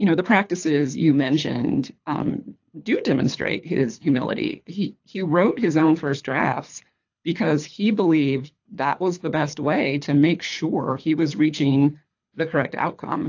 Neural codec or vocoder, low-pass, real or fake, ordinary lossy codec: codec, 24 kHz, 3 kbps, HILCodec; 7.2 kHz; fake; AAC, 48 kbps